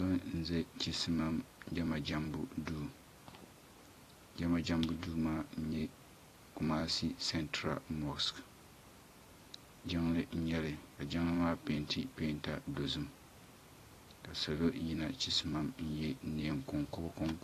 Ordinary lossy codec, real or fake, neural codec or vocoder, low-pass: AAC, 48 kbps; fake; vocoder, 44.1 kHz, 128 mel bands every 256 samples, BigVGAN v2; 14.4 kHz